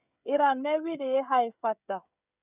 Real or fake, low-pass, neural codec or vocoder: fake; 3.6 kHz; codec, 16 kHz, 16 kbps, FreqCodec, smaller model